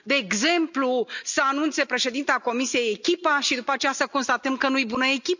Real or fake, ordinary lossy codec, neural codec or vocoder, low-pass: real; none; none; 7.2 kHz